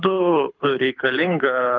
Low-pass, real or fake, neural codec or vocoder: 7.2 kHz; fake; codec, 24 kHz, 6 kbps, HILCodec